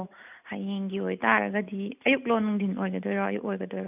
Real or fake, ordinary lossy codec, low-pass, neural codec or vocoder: real; none; 3.6 kHz; none